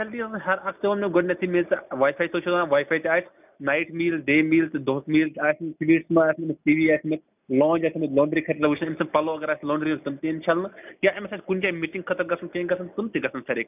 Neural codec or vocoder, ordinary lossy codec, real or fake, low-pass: none; none; real; 3.6 kHz